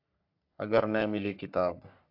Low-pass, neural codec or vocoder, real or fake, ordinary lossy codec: 5.4 kHz; codec, 44.1 kHz, 3.4 kbps, Pupu-Codec; fake; AAC, 48 kbps